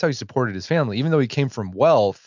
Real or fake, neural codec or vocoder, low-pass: real; none; 7.2 kHz